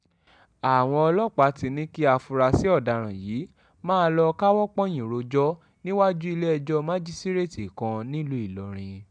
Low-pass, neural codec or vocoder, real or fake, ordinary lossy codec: 9.9 kHz; none; real; MP3, 96 kbps